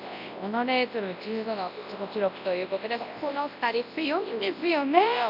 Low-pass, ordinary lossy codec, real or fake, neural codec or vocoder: 5.4 kHz; none; fake; codec, 24 kHz, 0.9 kbps, WavTokenizer, large speech release